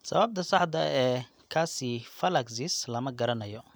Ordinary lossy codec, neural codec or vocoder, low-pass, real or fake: none; none; none; real